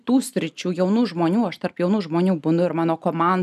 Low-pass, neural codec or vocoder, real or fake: 14.4 kHz; none; real